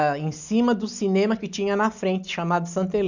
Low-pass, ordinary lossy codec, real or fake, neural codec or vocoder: 7.2 kHz; none; real; none